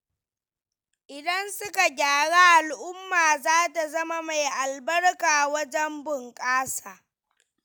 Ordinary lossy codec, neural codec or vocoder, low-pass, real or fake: none; none; none; real